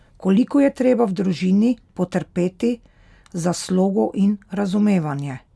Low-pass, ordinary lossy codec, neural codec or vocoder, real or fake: none; none; none; real